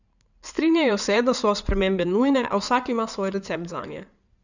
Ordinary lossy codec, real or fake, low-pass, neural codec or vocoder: none; fake; 7.2 kHz; codec, 16 kHz in and 24 kHz out, 2.2 kbps, FireRedTTS-2 codec